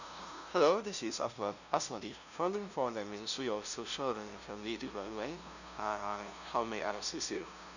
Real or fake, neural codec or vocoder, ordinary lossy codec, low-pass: fake; codec, 16 kHz, 0.5 kbps, FunCodec, trained on LibriTTS, 25 frames a second; none; 7.2 kHz